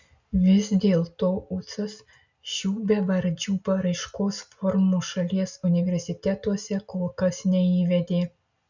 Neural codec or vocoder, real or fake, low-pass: none; real; 7.2 kHz